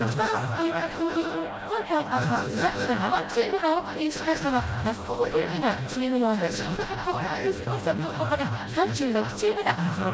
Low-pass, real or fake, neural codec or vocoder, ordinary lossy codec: none; fake; codec, 16 kHz, 0.5 kbps, FreqCodec, smaller model; none